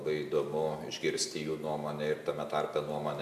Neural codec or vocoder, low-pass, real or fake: none; 14.4 kHz; real